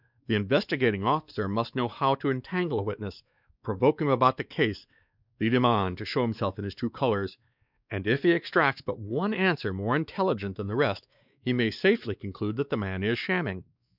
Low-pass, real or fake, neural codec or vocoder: 5.4 kHz; fake; codec, 16 kHz, 2 kbps, X-Codec, WavLM features, trained on Multilingual LibriSpeech